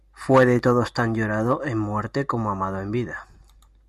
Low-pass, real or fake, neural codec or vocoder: 14.4 kHz; real; none